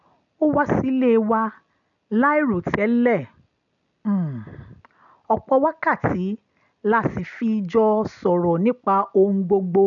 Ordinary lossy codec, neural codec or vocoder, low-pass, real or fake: none; none; 7.2 kHz; real